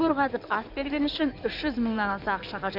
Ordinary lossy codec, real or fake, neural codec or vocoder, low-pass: none; fake; codec, 16 kHz in and 24 kHz out, 2.2 kbps, FireRedTTS-2 codec; 5.4 kHz